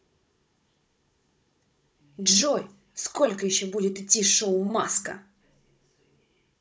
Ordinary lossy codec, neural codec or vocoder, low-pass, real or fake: none; codec, 16 kHz, 16 kbps, FunCodec, trained on Chinese and English, 50 frames a second; none; fake